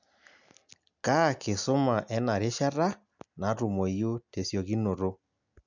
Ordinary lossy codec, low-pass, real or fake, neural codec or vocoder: none; 7.2 kHz; real; none